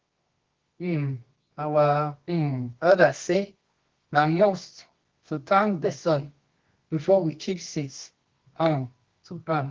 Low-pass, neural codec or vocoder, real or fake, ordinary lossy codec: 7.2 kHz; codec, 24 kHz, 0.9 kbps, WavTokenizer, medium music audio release; fake; Opus, 16 kbps